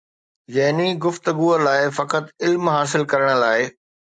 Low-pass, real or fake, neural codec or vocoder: 9.9 kHz; real; none